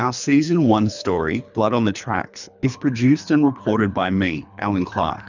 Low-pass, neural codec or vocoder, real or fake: 7.2 kHz; codec, 24 kHz, 3 kbps, HILCodec; fake